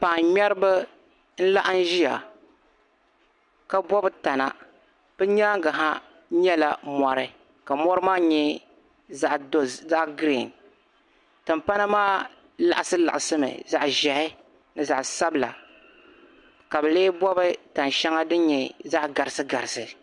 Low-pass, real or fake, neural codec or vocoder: 10.8 kHz; real; none